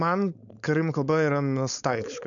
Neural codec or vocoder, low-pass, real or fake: codec, 16 kHz, 4.8 kbps, FACodec; 7.2 kHz; fake